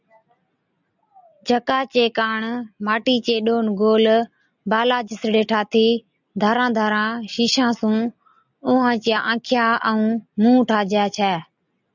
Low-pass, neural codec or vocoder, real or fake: 7.2 kHz; none; real